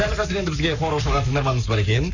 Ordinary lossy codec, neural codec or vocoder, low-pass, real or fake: none; codec, 44.1 kHz, 7.8 kbps, Pupu-Codec; 7.2 kHz; fake